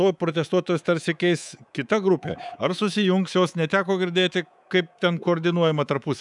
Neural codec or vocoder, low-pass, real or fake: codec, 24 kHz, 3.1 kbps, DualCodec; 10.8 kHz; fake